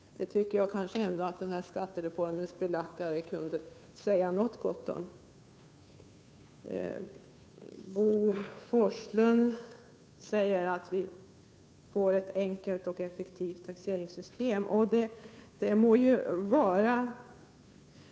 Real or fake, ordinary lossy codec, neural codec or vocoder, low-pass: fake; none; codec, 16 kHz, 2 kbps, FunCodec, trained on Chinese and English, 25 frames a second; none